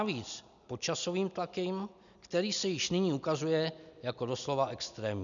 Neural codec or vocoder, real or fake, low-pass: none; real; 7.2 kHz